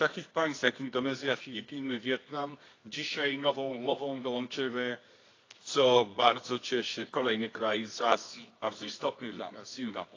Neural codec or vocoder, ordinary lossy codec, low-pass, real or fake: codec, 24 kHz, 0.9 kbps, WavTokenizer, medium music audio release; AAC, 32 kbps; 7.2 kHz; fake